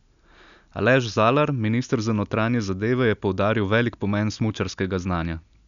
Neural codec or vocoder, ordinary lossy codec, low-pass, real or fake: none; none; 7.2 kHz; real